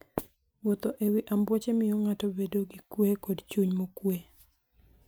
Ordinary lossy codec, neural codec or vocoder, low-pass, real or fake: none; none; none; real